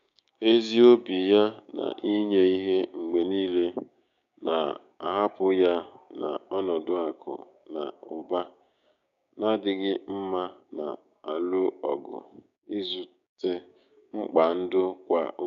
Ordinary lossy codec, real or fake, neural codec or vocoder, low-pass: none; fake; codec, 16 kHz, 6 kbps, DAC; 7.2 kHz